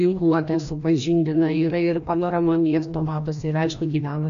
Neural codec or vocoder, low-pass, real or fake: codec, 16 kHz, 1 kbps, FreqCodec, larger model; 7.2 kHz; fake